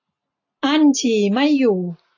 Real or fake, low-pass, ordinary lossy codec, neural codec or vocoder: real; 7.2 kHz; AAC, 48 kbps; none